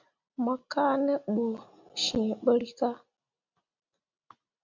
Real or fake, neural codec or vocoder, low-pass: real; none; 7.2 kHz